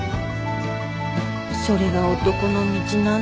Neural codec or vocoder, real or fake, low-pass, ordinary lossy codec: none; real; none; none